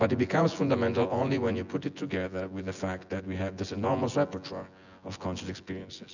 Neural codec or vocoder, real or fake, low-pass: vocoder, 24 kHz, 100 mel bands, Vocos; fake; 7.2 kHz